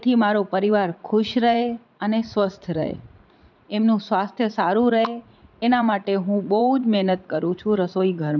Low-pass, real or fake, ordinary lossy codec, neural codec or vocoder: 7.2 kHz; fake; none; vocoder, 44.1 kHz, 80 mel bands, Vocos